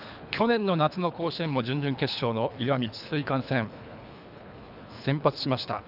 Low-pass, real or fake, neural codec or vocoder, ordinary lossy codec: 5.4 kHz; fake; codec, 24 kHz, 3 kbps, HILCodec; none